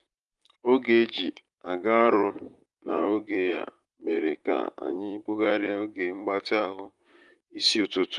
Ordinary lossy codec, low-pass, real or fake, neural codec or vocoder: Opus, 32 kbps; 10.8 kHz; fake; vocoder, 44.1 kHz, 128 mel bands, Pupu-Vocoder